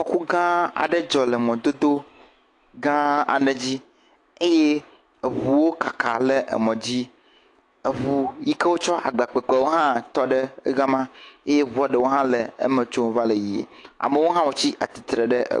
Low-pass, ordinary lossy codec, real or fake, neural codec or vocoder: 10.8 kHz; AAC, 48 kbps; fake; autoencoder, 48 kHz, 128 numbers a frame, DAC-VAE, trained on Japanese speech